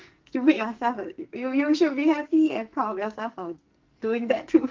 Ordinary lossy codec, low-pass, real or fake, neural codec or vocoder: Opus, 24 kbps; 7.2 kHz; fake; codec, 44.1 kHz, 2.6 kbps, SNAC